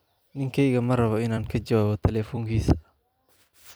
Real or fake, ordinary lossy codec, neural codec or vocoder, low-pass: fake; none; vocoder, 44.1 kHz, 128 mel bands every 256 samples, BigVGAN v2; none